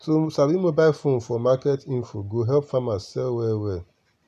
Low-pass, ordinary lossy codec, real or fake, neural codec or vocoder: 14.4 kHz; none; real; none